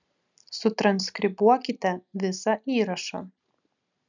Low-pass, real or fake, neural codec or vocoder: 7.2 kHz; real; none